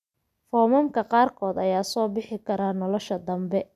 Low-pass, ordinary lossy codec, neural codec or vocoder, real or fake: 14.4 kHz; none; none; real